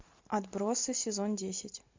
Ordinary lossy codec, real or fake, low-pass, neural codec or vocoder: MP3, 64 kbps; real; 7.2 kHz; none